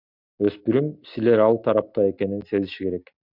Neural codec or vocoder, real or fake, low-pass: none; real; 5.4 kHz